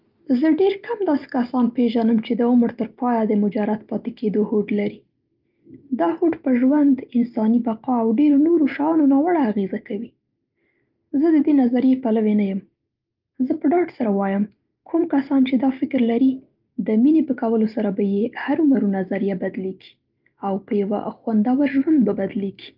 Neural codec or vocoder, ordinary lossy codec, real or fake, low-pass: none; Opus, 32 kbps; real; 5.4 kHz